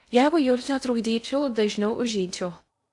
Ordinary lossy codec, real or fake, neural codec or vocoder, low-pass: AAC, 64 kbps; fake; codec, 16 kHz in and 24 kHz out, 0.6 kbps, FocalCodec, streaming, 2048 codes; 10.8 kHz